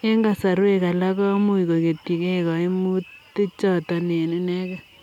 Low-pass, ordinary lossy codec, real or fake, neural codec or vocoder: 19.8 kHz; none; real; none